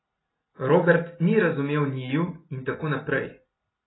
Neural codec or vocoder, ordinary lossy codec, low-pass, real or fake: none; AAC, 16 kbps; 7.2 kHz; real